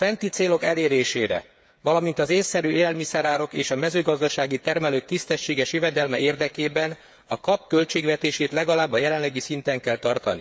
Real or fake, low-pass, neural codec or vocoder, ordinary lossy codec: fake; none; codec, 16 kHz, 8 kbps, FreqCodec, smaller model; none